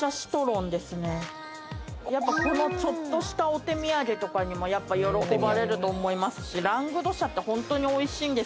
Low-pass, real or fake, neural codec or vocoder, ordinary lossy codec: none; real; none; none